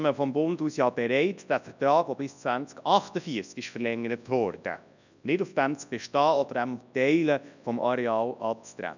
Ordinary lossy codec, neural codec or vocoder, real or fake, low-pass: none; codec, 24 kHz, 0.9 kbps, WavTokenizer, large speech release; fake; 7.2 kHz